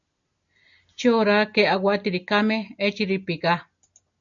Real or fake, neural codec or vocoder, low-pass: real; none; 7.2 kHz